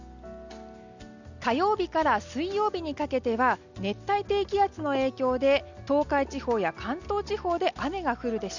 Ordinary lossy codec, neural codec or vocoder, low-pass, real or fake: none; none; 7.2 kHz; real